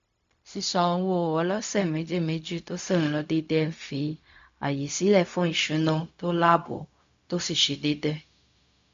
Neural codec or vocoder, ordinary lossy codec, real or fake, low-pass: codec, 16 kHz, 0.4 kbps, LongCat-Audio-Codec; MP3, 48 kbps; fake; 7.2 kHz